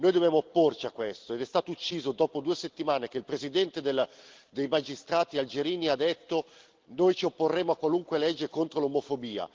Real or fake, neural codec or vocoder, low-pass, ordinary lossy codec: real; none; 7.2 kHz; Opus, 16 kbps